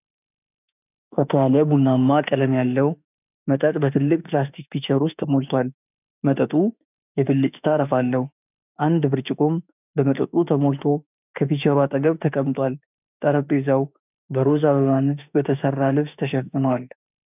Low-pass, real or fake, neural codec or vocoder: 3.6 kHz; fake; autoencoder, 48 kHz, 32 numbers a frame, DAC-VAE, trained on Japanese speech